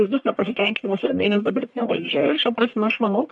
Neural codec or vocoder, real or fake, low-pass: codec, 44.1 kHz, 1.7 kbps, Pupu-Codec; fake; 10.8 kHz